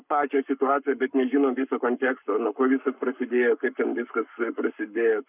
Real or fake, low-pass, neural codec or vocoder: fake; 3.6 kHz; codec, 44.1 kHz, 7.8 kbps, Pupu-Codec